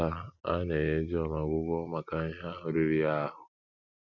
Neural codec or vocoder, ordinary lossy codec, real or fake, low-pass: none; MP3, 64 kbps; real; 7.2 kHz